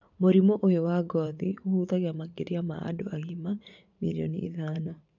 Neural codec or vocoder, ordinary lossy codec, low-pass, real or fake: vocoder, 44.1 kHz, 80 mel bands, Vocos; none; 7.2 kHz; fake